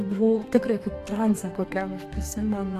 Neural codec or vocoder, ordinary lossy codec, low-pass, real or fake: codec, 32 kHz, 1.9 kbps, SNAC; AAC, 64 kbps; 14.4 kHz; fake